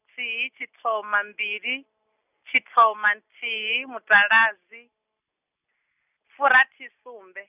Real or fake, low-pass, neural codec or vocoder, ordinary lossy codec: real; 3.6 kHz; none; none